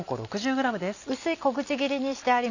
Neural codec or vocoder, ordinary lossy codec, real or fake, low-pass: none; none; real; 7.2 kHz